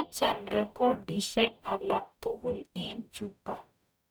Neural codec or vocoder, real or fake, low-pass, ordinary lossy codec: codec, 44.1 kHz, 0.9 kbps, DAC; fake; none; none